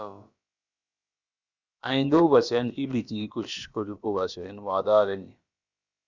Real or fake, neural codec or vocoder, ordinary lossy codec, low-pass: fake; codec, 16 kHz, about 1 kbps, DyCAST, with the encoder's durations; Opus, 64 kbps; 7.2 kHz